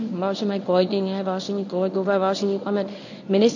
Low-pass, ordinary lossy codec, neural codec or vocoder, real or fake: 7.2 kHz; AAC, 48 kbps; codec, 24 kHz, 0.9 kbps, WavTokenizer, medium speech release version 1; fake